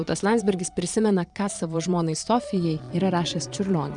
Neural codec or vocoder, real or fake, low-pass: vocoder, 22.05 kHz, 80 mel bands, Vocos; fake; 9.9 kHz